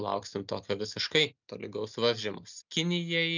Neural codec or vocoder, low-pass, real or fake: none; 7.2 kHz; real